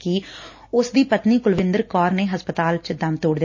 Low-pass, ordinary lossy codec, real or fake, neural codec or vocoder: 7.2 kHz; MP3, 32 kbps; real; none